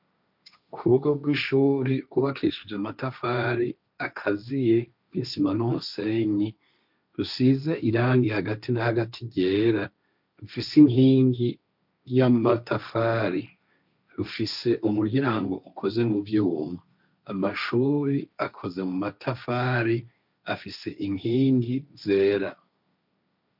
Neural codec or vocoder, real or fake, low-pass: codec, 16 kHz, 1.1 kbps, Voila-Tokenizer; fake; 5.4 kHz